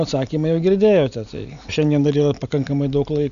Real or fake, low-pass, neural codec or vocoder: real; 7.2 kHz; none